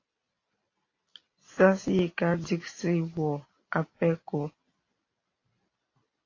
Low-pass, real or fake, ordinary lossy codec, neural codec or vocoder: 7.2 kHz; real; AAC, 32 kbps; none